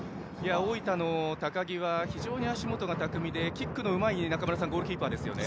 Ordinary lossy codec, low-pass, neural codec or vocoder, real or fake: none; none; none; real